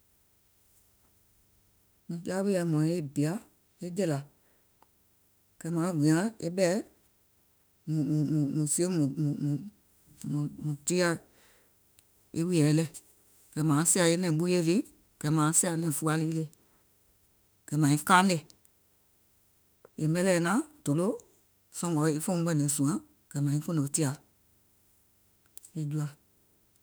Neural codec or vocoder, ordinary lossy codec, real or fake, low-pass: autoencoder, 48 kHz, 32 numbers a frame, DAC-VAE, trained on Japanese speech; none; fake; none